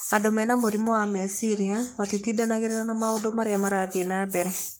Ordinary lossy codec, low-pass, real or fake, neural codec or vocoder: none; none; fake; codec, 44.1 kHz, 3.4 kbps, Pupu-Codec